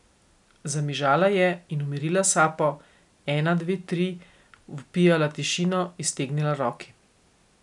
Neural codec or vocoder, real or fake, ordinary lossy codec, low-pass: none; real; none; 10.8 kHz